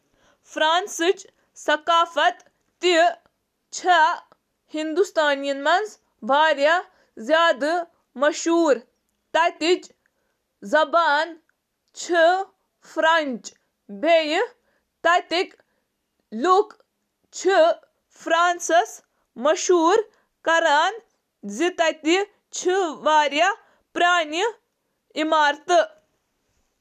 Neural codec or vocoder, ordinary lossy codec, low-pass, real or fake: none; none; 14.4 kHz; real